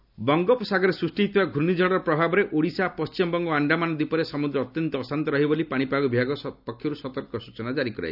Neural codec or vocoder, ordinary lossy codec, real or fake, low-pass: none; none; real; 5.4 kHz